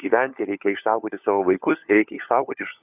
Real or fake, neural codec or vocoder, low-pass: fake; codec, 16 kHz, 4 kbps, FunCodec, trained on LibriTTS, 50 frames a second; 3.6 kHz